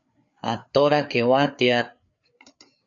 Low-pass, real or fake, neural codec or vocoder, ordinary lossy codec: 7.2 kHz; fake; codec, 16 kHz, 4 kbps, FreqCodec, larger model; AAC, 64 kbps